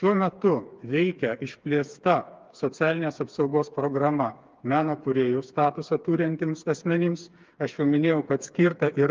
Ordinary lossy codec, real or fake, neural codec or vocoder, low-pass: Opus, 24 kbps; fake; codec, 16 kHz, 4 kbps, FreqCodec, smaller model; 7.2 kHz